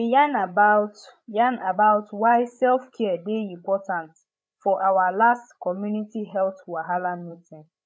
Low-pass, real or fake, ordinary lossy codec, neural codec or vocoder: none; fake; none; codec, 16 kHz, 16 kbps, FreqCodec, larger model